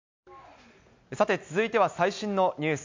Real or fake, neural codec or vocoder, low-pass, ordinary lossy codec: real; none; 7.2 kHz; MP3, 64 kbps